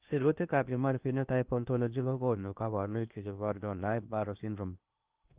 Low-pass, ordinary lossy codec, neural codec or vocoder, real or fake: 3.6 kHz; Opus, 32 kbps; codec, 16 kHz in and 24 kHz out, 0.8 kbps, FocalCodec, streaming, 65536 codes; fake